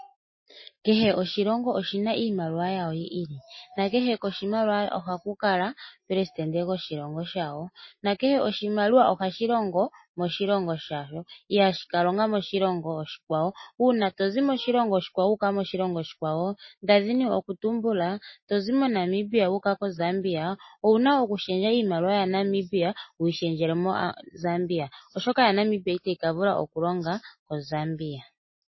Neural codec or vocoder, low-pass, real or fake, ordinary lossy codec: none; 7.2 kHz; real; MP3, 24 kbps